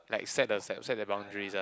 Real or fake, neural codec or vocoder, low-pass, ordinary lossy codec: real; none; none; none